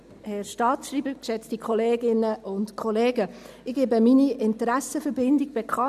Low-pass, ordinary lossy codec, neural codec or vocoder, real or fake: 14.4 kHz; none; none; real